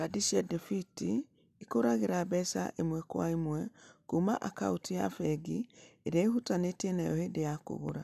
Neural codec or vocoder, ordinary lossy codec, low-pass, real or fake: none; none; 14.4 kHz; real